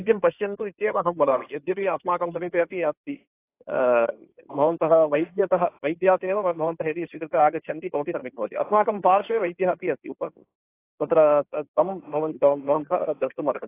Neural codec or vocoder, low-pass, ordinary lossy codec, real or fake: codec, 16 kHz in and 24 kHz out, 2.2 kbps, FireRedTTS-2 codec; 3.6 kHz; AAC, 24 kbps; fake